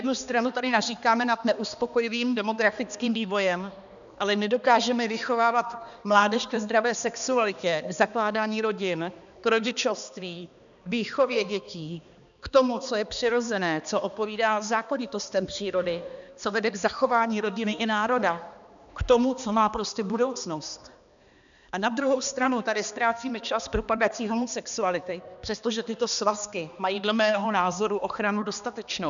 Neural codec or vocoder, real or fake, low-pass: codec, 16 kHz, 2 kbps, X-Codec, HuBERT features, trained on balanced general audio; fake; 7.2 kHz